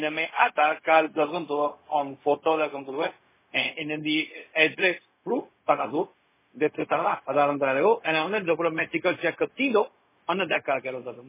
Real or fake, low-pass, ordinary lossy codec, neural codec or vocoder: fake; 3.6 kHz; MP3, 16 kbps; codec, 16 kHz, 0.4 kbps, LongCat-Audio-Codec